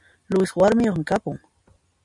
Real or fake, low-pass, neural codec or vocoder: real; 10.8 kHz; none